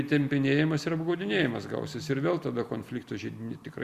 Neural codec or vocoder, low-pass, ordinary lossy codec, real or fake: none; 14.4 kHz; Opus, 64 kbps; real